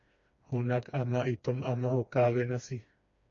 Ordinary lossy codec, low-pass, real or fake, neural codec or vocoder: MP3, 48 kbps; 7.2 kHz; fake; codec, 16 kHz, 2 kbps, FreqCodec, smaller model